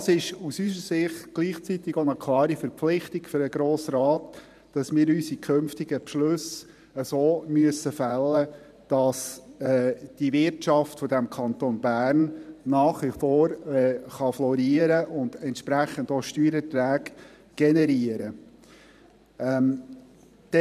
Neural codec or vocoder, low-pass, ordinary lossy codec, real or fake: vocoder, 44.1 kHz, 128 mel bands every 512 samples, BigVGAN v2; 14.4 kHz; none; fake